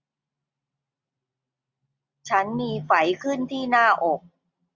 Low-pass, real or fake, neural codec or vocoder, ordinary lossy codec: 7.2 kHz; real; none; Opus, 64 kbps